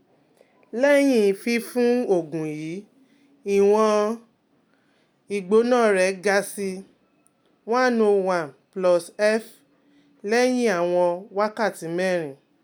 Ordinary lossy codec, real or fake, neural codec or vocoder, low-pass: none; real; none; none